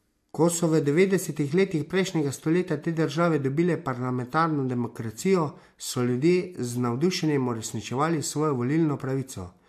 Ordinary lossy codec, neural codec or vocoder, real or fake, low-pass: MP3, 64 kbps; none; real; 14.4 kHz